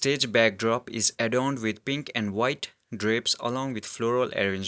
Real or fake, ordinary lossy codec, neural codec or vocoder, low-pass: real; none; none; none